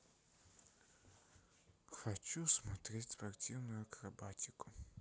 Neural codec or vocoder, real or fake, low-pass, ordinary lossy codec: none; real; none; none